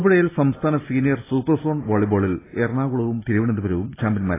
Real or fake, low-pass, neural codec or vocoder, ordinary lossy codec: real; 3.6 kHz; none; AAC, 16 kbps